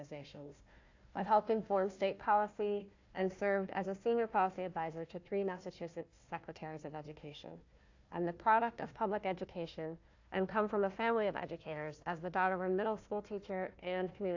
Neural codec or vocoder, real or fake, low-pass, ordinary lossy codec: codec, 16 kHz, 1 kbps, FunCodec, trained on Chinese and English, 50 frames a second; fake; 7.2 kHz; AAC, 48 kbps